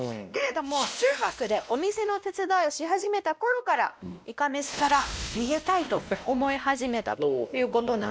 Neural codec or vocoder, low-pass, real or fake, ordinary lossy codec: codec, 16 kHz, 1 kbps, X-Codec, WavLM features, trained on Multilingual LibriSpeech; none; fake; none